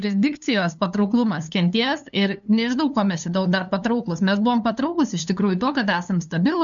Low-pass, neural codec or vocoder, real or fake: 7.2 kHz; codec, 16 kHz, 2 kbps, FunCodec, trained on LibriTTS, 25 frames a second; fake